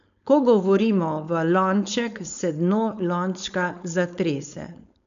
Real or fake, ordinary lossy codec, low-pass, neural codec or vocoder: fake; none; 7.2 kHz; codec, 16 kHz, 4.8 kbps, FACodec